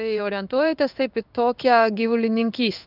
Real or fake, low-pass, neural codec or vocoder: fake; 5.4 kHz; codec, 16 kHz in and 24 kHz out, 1 kbps, XY-Tokenizer